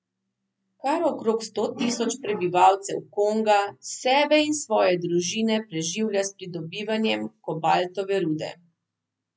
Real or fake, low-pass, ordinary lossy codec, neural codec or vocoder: real; none; none; none